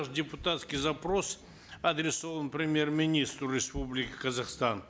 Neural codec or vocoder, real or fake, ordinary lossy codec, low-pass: none; real; none; none